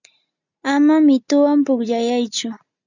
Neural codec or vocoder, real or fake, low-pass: none; real; 7.2 kHz